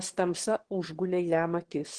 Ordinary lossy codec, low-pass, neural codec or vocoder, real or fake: Opus, 16 kbps; 9.9 kHz; autoencoder, 22.05 kHz, a latent of 192 numbers a frame, VITS, trained on one speaker; fake